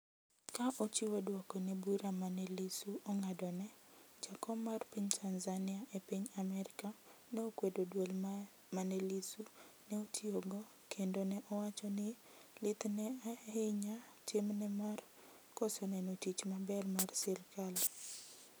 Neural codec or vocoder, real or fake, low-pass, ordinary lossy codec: none; real; none; none